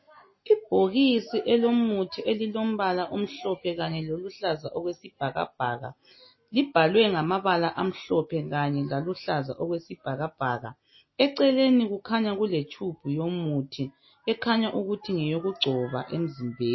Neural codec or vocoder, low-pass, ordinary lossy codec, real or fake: none; 7.2 kHz; MP3, 24 kbps; real